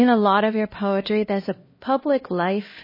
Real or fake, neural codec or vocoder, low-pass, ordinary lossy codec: fake; codec, 16 kHz, 1 kbps, X-Codec, HuBERT features, trained on LibriSpeech; 5.4 kHz; MP3, 24 kbps